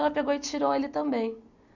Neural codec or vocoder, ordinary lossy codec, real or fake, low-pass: none; none; real; 7.2 kHz